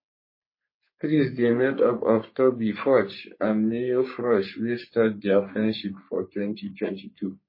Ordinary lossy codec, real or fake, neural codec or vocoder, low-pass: MP3, 24 kbps; fake; codec, 44.1 kHz, 3.4 kbps, Pupu-Codec; 5.4 kHz